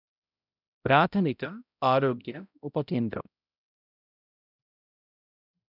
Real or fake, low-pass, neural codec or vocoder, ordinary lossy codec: fake; 5.4 kHz; codec, 16 kHz, 0.5 kbps, X-Codec, HuBERT features, trained on balanced general audio; none